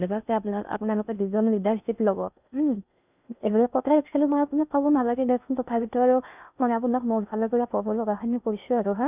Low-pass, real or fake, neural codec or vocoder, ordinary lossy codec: 3.6 kHz; fake; codec, 16 kHz in and 24 kHz out, 0.6 kbps, FocalCodec, streaming, 4096 codes; none